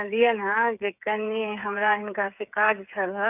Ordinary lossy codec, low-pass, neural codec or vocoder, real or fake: none; 3.6 kHz; codec, 16 kHz, 8 kbps, FreqCodec, smaller model; fake